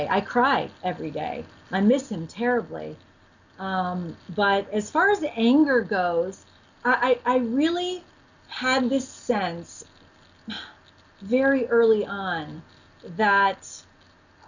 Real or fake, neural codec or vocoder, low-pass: real; none; 7.2 kHz